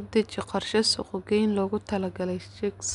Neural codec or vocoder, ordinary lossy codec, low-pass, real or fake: none; none; 10.8 kHz; real